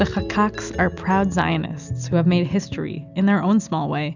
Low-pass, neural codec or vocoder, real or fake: 7.2 kHz; none; real